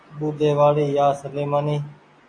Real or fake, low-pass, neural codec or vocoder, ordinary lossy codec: real; 9.9 kHz; none; AAC, 48 kbps